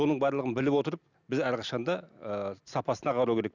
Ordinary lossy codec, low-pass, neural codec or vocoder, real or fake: none; 7.2 kHz; none; real